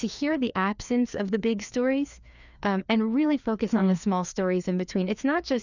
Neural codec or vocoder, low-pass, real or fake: codec, 16 kHz, 2 kbps, FreqCodec, larger model; 7.2 kHz; fake